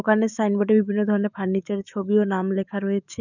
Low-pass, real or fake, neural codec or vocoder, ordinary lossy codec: 7.2 kHz; fake; autoencoder, 48 kHz, 128 numbers a frame, DAC-VAE, trained on Japanese speech; none